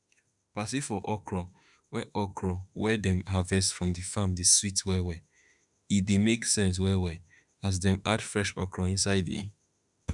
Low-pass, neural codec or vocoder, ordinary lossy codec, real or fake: 10.8 kHz; autoencoder, 48 kHz, 32 numbers a frame, DAC-VAE, trained on Japanese speech; none; fake